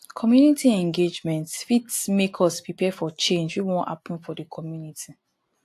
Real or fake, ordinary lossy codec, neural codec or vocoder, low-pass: real; AAC, 64 kbps; none; 14.4 kHz